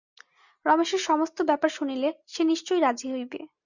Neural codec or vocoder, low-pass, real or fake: none; 7.2 kHz; real